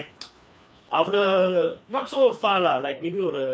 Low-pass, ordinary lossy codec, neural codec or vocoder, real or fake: none; none; codec, 16 kHz, 2 kbps, FreqCodec, larger model; fake